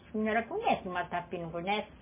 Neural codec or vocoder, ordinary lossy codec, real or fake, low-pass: none; MP3, 16 kbps; real; 3.6 kHz